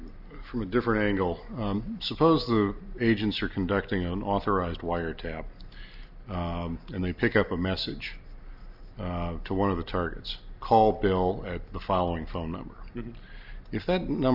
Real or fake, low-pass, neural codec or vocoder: real; 5.4 kHz; none